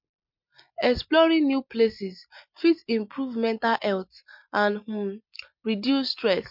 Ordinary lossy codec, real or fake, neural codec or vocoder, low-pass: MP3, 48 kbps; real; none; 5.4 kHz